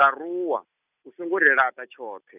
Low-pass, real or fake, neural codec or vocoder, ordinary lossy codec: 3.6 kHz; real; none; none